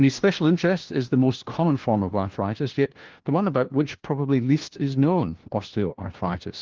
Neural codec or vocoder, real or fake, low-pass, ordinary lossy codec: codec, 16 kHz, 1 kbps, FunCodec, trained on LibriTTS, 50 frames a second; fake; 7.2 kHz; Opus, 16 kbps